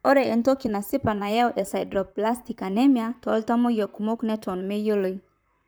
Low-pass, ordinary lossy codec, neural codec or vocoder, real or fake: none; none; vocoder, 44.1 kHz, 128 mel bands, Pupu-Vocoder; fake